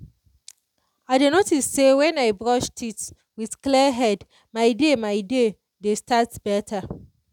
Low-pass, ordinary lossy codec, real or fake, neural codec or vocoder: 19.8 kHz; none; real; none